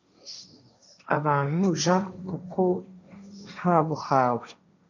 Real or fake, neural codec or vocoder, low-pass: fake; codec, 16 kHz, 1.1 kbps, Voila-Tokenizer; 7.2 kHz